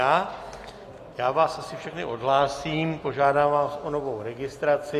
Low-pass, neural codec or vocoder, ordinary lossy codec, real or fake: 14.4 kHz; none; AAC, 48 kbps; real